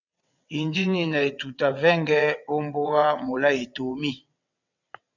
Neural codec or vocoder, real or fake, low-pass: vocoder, 22.05 kHz, 80 mel bands, WaveNeXt; fake; 7.2 kHz